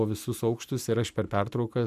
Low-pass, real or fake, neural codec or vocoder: 14.4 kHz; real; none